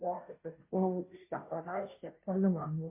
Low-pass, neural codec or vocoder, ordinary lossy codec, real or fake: 3.6 kHz; codec, 16 kHz, 0.5 kbps, FunCodec, trained on Chinese and English, 25 frames a second; MP3, 24 kbps; fake